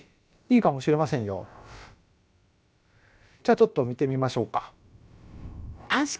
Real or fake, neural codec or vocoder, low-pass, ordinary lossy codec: fake; codec, 16 kHz, about 1 kbps, DyCAST, with the encoder's durations; none; none